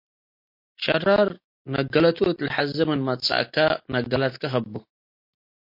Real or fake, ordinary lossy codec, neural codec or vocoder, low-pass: real; MP3, 32 kbps; none; 5.4 kHz